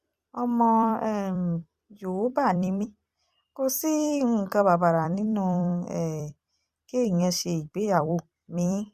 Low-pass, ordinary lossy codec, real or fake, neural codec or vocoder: 14.4 kHz; Opus, 64 kbps; fake; vocoder, 44.1 kHz, 128 mel bands every 256 samples, BigVGAN v2